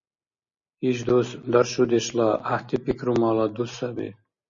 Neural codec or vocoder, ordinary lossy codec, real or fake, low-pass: none; MP3, 32 kbps; real; 7.2 kHz